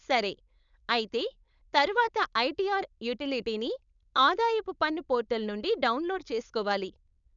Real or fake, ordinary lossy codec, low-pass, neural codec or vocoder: fake; none; 7.2 kHz; codec, 16 kHz, 4.8 kbps, FACodec